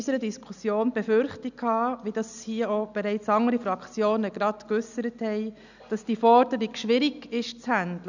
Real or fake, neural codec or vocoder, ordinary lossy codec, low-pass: real; none; none; 7.2 kHz